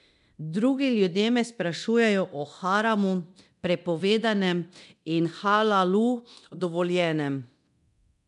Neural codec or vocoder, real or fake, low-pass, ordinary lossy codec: codec, 24 kHz, 0.9 kbps, DualCodec; fake; 10.8 kHz; none